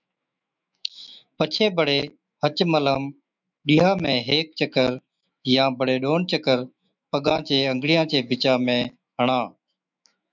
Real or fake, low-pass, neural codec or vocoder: fake; 7.2 kHz; autoencoder, 48 kHz, 128 numbers a frame, DAC-VAE, trained on Japanese speech